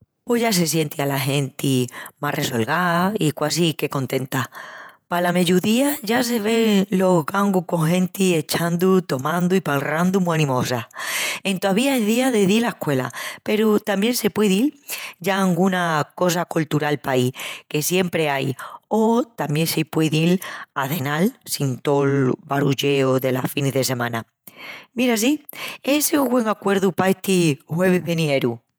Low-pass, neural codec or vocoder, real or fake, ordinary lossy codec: none; vocoder, 48 kHz, 128 mel bands, Vocos; fake; none